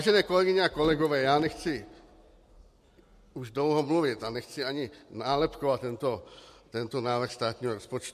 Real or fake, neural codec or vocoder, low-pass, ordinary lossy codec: real; none; 14.4 kHz; MP3, 64 kbps